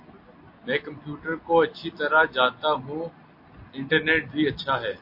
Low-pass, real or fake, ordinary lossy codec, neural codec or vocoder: 5.4 kHz; real; MP3, 32 kbps; none